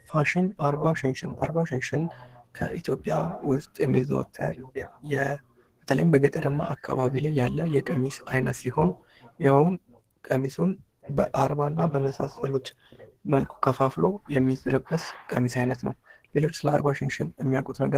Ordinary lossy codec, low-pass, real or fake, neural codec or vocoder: Opus, 16 kbps; 14.4 kHz; fake; codec, 32 kHz, 1.9 kbps, SNAC